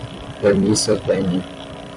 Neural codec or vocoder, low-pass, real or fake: vocoder, 44.1 kHz, 128 mel bands every 256 samples, BigVGAN v2; 10.8 kHz; fake